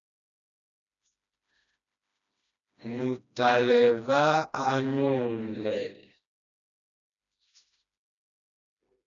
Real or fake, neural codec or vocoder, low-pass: fake; codec, 16 kHz, 1 kbps, FreqCodec, smaller model; 7.2 kHz